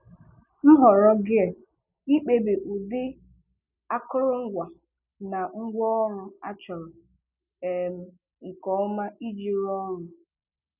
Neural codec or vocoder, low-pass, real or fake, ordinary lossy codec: none; 3.6 kHz; real; none